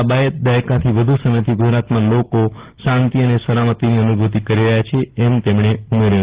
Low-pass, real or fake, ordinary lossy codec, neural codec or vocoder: 3.6 kHz; real; Opus, 16 kbps; none